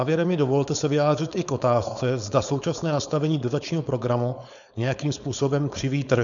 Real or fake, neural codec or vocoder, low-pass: fake; codec, 16 kHz, 4.8 kbps, FACodec; 7.2 kHz